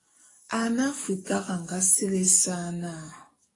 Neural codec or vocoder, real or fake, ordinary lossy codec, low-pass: codec, 44.1 kHz, 7.8 kbps, DAC; fake; AAC, 32 kbps; 10.8 kHz